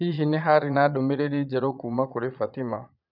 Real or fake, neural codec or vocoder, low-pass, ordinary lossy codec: fake; vocoder, 22.05 kHz, 80 mel bands, Vocos; 5.4 kHz; none